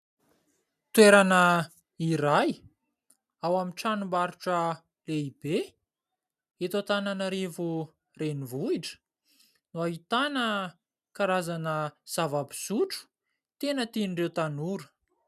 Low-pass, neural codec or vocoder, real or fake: 14.4 kHz; none; real